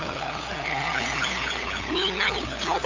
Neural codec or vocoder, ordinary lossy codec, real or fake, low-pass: codec, 16 kHz, 8 kbps, FunCodec, trained on LibriTTS, 25 frames a second; AAC, 48 kbps; fake; 7.2 kHz